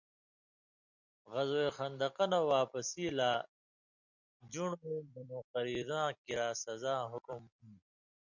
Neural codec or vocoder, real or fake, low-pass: vocoder, 44.1 kHz, 128 mel bands every 256 samples, BigVGAN v2; fake; 7.2 kHz